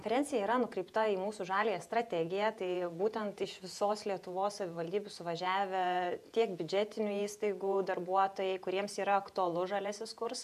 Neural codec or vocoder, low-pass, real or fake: vocoder, 48 kHz, 128 mel bands, Vocos; 14.4 kHz; fake